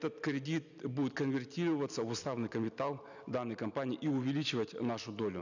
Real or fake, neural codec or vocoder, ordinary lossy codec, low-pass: real; none; none; 7.2 kHz